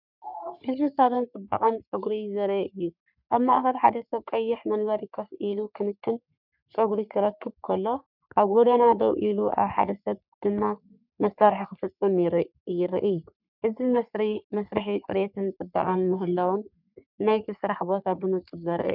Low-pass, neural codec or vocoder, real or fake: 5.4 kHz; codec, 44.1 kHz, 3.4 kbps, Pupu-Codec; fake